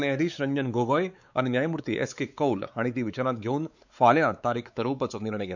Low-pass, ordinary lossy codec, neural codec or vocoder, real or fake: 7.2 kHz; none; codec, 16 kHz, 4 kbps, X-Codec, WavLM features, trained on Multilingual LibriSpeech; fake